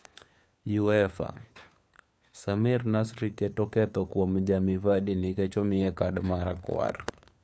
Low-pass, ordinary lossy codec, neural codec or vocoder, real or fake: none; none; codec, 16 kHz, 4 kbps, FunCodec, trained on LibriTTS, 50 frames a second; fake